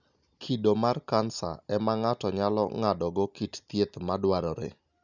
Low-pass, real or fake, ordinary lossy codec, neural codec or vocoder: 7.2 kHz; real; none; none